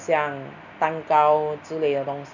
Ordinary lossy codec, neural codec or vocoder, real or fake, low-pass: none; none; real; 7.2 kHz